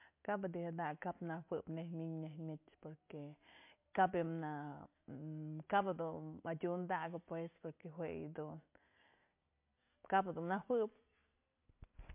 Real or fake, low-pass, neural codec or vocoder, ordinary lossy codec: real; 3.6 kHz; none; MP3, 32 kbps